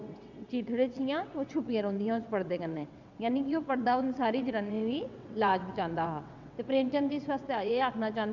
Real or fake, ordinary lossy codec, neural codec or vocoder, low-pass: fake; none; vocoder, 22.05 kHz, 80 mel bands, WaveNeXt; 7.2 kHz